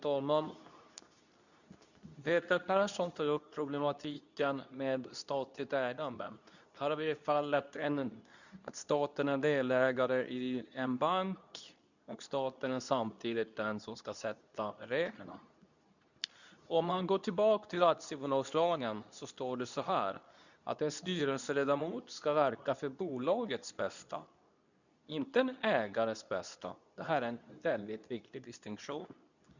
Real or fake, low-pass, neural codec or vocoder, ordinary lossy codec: fake; 7.2 kHz; codec, 24 kHz, 0.9 kbps, WavTokenizer, medium speech release version 2; none